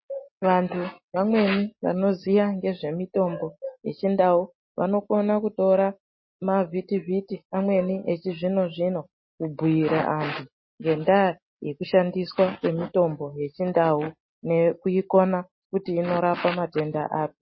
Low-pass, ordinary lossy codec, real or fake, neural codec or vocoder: 7.2 kHz; MP3, 24 kbps; real; none